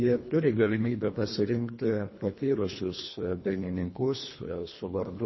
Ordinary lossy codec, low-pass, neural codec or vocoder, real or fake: MP3, 24 kbps; 7.2 kHz; codec, 24 kHz, 1.5 kbps, HILCodec; fake